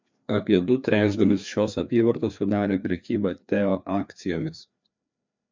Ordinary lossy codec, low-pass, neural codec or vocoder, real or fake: MP3, 64 kbps; 7.2 kHz; codec, 16 kHz, 2 kbps, FreqCodec, larger model; fake